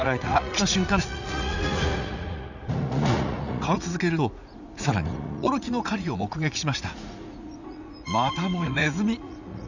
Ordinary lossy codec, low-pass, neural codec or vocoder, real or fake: none; 7.2 kHz; vocoder, 44.1 kHz, 80 mel bands, Vocos; fake